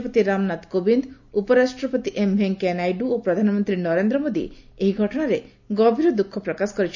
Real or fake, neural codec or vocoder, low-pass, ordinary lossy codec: real; none; 7.2 kHz; none